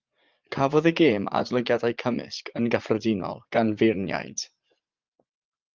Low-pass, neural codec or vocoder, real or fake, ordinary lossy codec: 7.2 kHz; vocoder, 44.1 kHz, 80 mel bands, Vocos; fake; Opus, 24 kbps